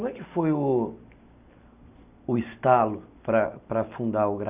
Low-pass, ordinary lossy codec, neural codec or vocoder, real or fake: 3.6 kHz; none; none; real